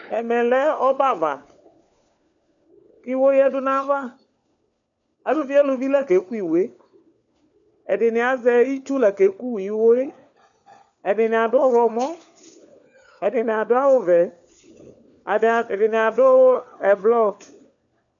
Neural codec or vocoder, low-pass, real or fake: codec, 16 kHz, 4 kbps, FunCodec, trained on LibriTTS, 50 frames a second; 7.2 kHz; fake